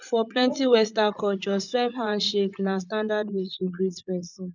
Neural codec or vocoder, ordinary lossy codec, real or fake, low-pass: vocoder, 44.1 kHz, 80 mel bands, Vocos; none; fake; 7.2 kHz